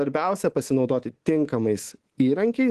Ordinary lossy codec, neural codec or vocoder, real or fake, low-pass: Opus, 16 kbps; codec, 24 kHz, 3.1 kbps, DualCodec; fake; 10.8 kHz